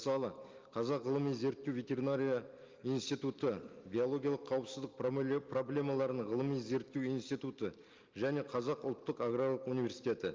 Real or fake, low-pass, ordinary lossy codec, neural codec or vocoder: real; 7.2 kHz; Opus, 24 kbps; none